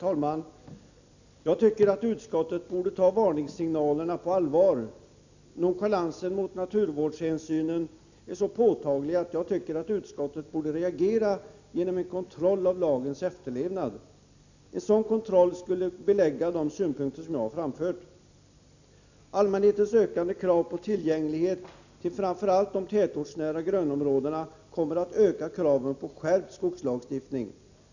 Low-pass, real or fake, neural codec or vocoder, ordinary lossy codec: 7.2 kHz; real; none; none